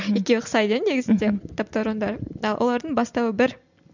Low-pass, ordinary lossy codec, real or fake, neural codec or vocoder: 7.2 kHz; none; real; none